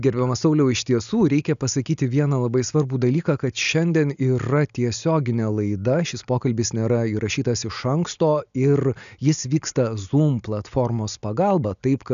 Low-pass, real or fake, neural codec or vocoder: 7.2 kHz; real; none